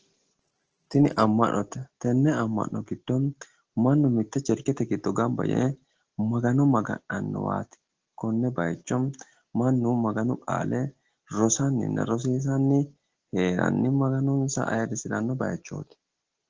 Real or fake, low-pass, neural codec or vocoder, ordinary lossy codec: real; 7.2 kHz; none; Opus, 16 kbps